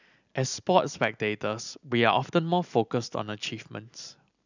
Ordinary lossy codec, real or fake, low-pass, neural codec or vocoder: none; real; 7.2 kHz; none